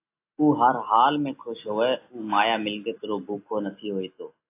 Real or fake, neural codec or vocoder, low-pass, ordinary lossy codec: real; none; 3.6 kHz; AAC, 24 kbps